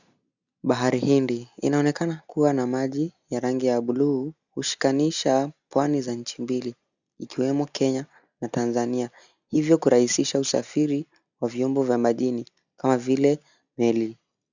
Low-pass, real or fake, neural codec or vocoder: 7.2 kHz; real; none